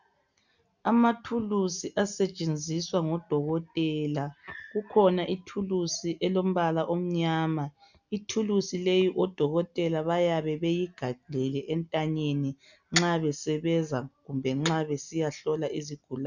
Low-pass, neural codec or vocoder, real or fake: 7.2 kHz; none; real